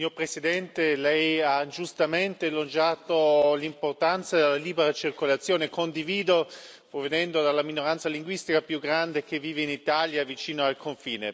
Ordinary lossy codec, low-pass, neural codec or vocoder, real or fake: none; none; none; real